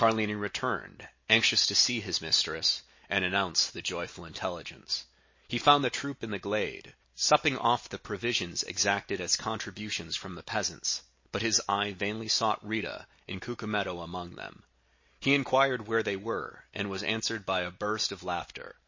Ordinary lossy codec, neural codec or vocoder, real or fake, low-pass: MP3, 32 kbps; none; real; 7.2 kHz